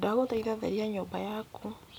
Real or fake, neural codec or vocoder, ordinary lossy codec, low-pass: real; none; none; none